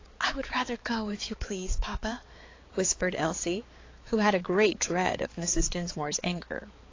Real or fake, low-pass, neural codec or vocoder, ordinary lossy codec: fake; 7.2 kHz; codec, 16 kHz, 4 kbps, X-Codec, HuBERT features, trained on balanced general audio; AAC, 32 kbps